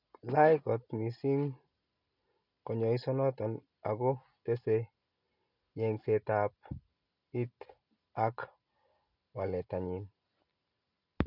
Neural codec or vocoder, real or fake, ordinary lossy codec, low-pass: none; real; none; 5.4 kHz